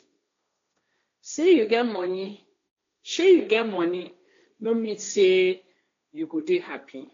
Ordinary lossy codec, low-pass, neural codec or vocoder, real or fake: AAC, 48 kbps; 7.2 kHz; codec, 16 kHz, 1.1 kbps, Voila-Tokenizer; fake